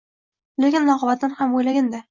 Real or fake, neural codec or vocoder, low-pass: real; none; 7.2 kHz